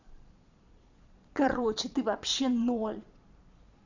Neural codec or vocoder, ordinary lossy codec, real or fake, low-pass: vocoder, 22.05 kHz, 80 mel bands, Vocos; none; fake; 7.2 kHz